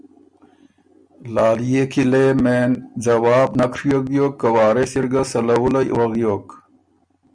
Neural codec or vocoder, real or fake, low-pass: none; real; 9.9 kHz